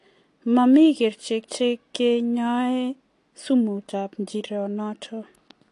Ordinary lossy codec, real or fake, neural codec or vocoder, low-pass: AAC, 48 kbps; real; none; 10.8 kHz